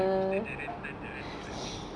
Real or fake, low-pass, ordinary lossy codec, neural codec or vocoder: real; 9.9 kHz; none; none